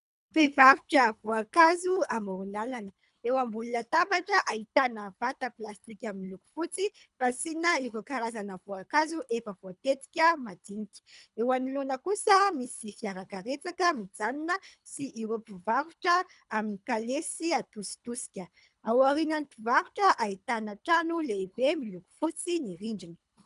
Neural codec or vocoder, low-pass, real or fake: codec, 24 kHz, 3 kbps, HILCodec; 10.8 kHz; fake